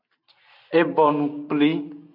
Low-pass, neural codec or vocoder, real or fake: 5.4 kHz; none; real